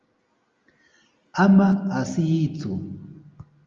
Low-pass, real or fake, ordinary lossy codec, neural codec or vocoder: 7.2 kHz; real; Opus, 32 kbps; none